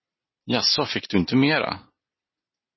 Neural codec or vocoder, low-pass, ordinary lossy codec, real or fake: none; 7.2 kHz; MP3, 24 kbps; real